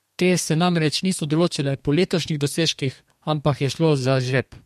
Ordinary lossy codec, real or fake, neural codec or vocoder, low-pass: MP3, 64 kbps; fake; codec, 32 kHz, 1.9 kbps, SNAC; 14.4 kHz